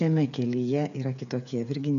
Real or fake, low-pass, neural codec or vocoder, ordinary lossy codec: fake; 7.2 kHz; codec, 16 kHz, 8 kbps, FreqCodec, smaller model; MP3, 96 kbps